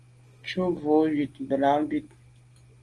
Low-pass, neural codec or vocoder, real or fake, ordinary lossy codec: 10.8 kHz; none; real; Opus, 24 kbps